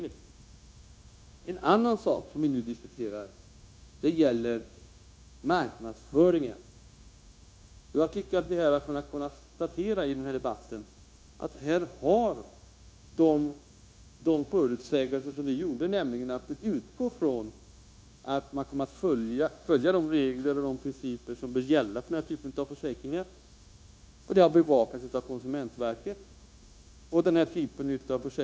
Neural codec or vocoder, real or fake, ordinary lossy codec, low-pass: codec, 16 kHz, 0.9 kbps, LongCat-Audio-Codec; fake; none; none